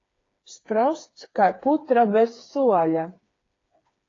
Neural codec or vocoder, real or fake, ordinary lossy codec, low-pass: codec, 16 kHz, 4 kbps, FreqCodec, smaller model; fake; AAC, 32 kbps; 7.2 kHz